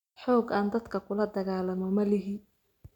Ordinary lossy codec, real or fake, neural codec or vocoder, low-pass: none; real; none; 19.8 kHz